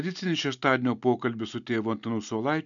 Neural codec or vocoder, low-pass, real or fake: none; 7.2 kHz; real